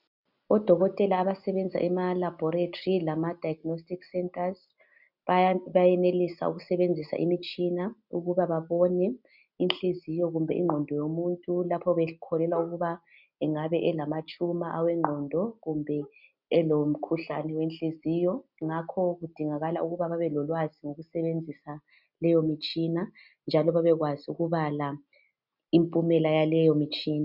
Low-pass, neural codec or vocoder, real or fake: 5.4 kHz; none; real